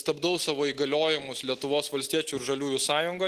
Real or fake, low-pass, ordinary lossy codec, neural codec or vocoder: real; 14.4 kHz; Opus, 16 kbps; none